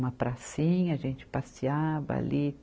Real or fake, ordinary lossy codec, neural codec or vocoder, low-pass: real; none; none; none